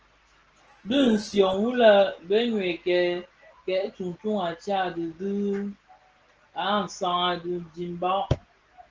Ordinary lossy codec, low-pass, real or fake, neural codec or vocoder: Opus, 16 kbps; 7.2 kHz; real; none